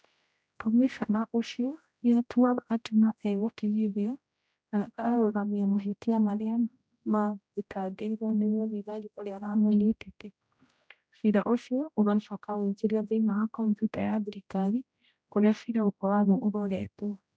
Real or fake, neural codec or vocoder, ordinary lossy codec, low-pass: fake; codec, 16 kHz, 0.5 kbps, X-Codec, HuBERT features, trained on general audio; none; none